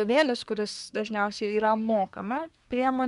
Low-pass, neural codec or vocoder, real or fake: 10.8 kHz; codec, 24 kHz, 1 kbps, SNAC; fake